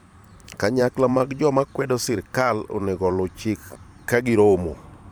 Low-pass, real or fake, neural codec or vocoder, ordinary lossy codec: none; fake; vocoder, 44.1 kHz, 128 mel bands every 512 samples, BigVGAN v2; none